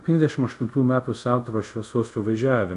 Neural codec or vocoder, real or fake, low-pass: codec, 24 kHz, 0.5 kbps, DualCodec; fake; 10.8 kHz